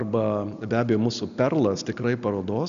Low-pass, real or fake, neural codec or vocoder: 7.2 kHz; real; none